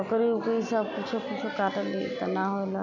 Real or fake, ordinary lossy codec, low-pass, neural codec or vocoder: real; none; 7.2 kHz; none